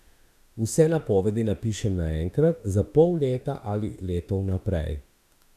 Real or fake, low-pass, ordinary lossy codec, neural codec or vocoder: fake; 14.4 kHz; none; autoencoder, 48 kHz, 32 numbers a frame, DAC-VAE, trained on Japanese speech